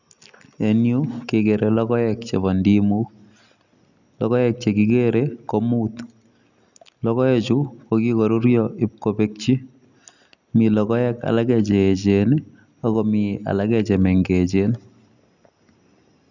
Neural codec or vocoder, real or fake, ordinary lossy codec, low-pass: none; real; none; 7.2 kHz